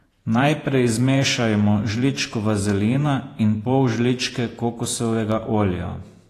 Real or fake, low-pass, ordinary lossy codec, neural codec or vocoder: fake; 14.4 kHz; AAC, 48 kbps; vocoder, 48 kHz, 128 mel bands, Vocos